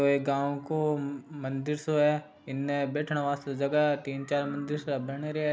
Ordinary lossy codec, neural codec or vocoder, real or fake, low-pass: none; none; real; none